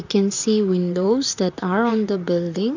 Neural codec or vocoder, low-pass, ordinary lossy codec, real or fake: vocoder, 44.1 kHz, 128 mel bands, Pupu-Vocoder; 7.2 kHz; none; fake